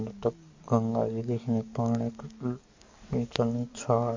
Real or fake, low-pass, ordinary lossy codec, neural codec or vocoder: fake; 7.2 kHz; MP3, 48 kbps; codec, 44.1 kHz, 7.8 kbps, DAC